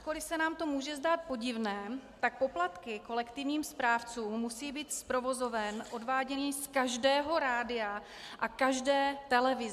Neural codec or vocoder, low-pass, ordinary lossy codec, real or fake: none; 14.4 kHz; MP3, 96 kbps; real